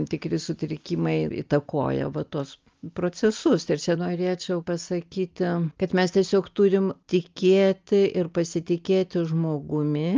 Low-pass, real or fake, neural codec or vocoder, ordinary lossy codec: 7.2 kHz; real; none; Opus, 24 kbps